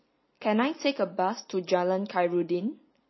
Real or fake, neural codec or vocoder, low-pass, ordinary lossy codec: real; none; 7.2 kHz; MP3, 24 kbps